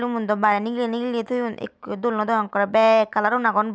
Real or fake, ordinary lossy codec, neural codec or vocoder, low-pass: real; none; none; none